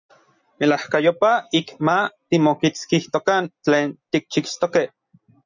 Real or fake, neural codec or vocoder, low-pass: real; none; 7.2 kHz